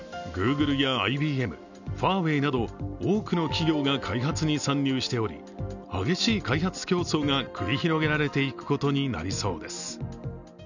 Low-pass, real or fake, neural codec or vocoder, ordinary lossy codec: 7.2 kHz; real; none; none